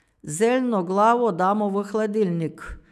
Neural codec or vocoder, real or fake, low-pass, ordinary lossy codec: autoencoder, 48 kHz, 128 numbers a frame, DAC-VAE, trained on Japanese speech; fake; 14.4 kHz; none